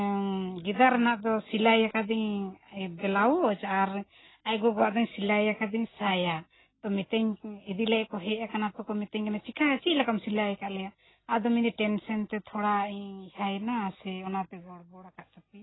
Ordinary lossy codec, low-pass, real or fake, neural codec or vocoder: AAC, 16 kbps; 7.2 kHz; real; none